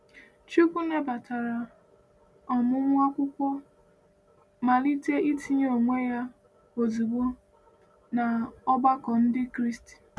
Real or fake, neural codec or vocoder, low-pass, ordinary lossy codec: real; none; none; none